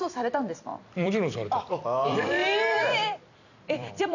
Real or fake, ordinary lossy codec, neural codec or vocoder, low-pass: fake; none; vocoder, 44.1 kHz, 128 mel bands every 256 samples, BigVGAN v2; 7.2 kHz